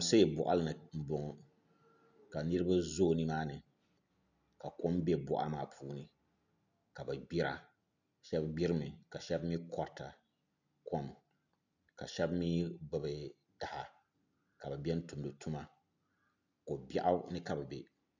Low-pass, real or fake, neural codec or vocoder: 7.2 kHz; real; none